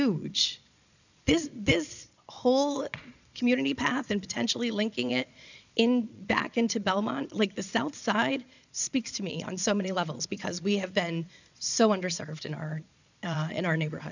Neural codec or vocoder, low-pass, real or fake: vocoder, 22.05 kHz, 80 mel bands, Vocos; 7.2 kHz; fake